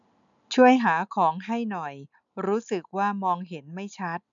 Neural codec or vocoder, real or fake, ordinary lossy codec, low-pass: none; real; none; 7.2 kHz